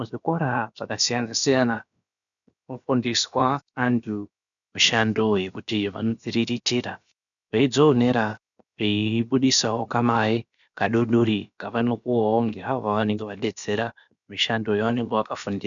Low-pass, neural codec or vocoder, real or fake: 7.2 kHz; codec, 16 kHz, about 1 kbps, DyCAST, with the encoder's durations; fake